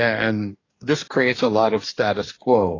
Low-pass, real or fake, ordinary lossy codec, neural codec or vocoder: 7.2 kHz; fake; AAC, 32 kbps; codec, 44.1 kHz, 2.6 kbps, DAC